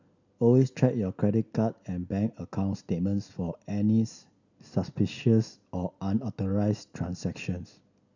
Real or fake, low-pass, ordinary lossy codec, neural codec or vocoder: real; 7.2 kHz; none; none